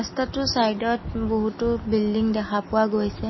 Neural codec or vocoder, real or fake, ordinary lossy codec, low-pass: none; real; MP3, 24 kbps; 7.2 kHz